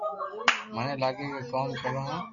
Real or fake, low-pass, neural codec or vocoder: real; 7.2 kHz; none